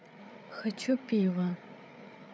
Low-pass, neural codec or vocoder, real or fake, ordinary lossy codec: none; codec, 16 kHz, 8 kbps, FreqCodec, larger model; fake; none